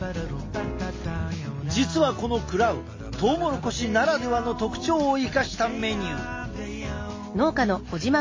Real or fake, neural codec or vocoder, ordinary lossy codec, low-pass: real; none; MP3, 32 kbps; 7.2 kHz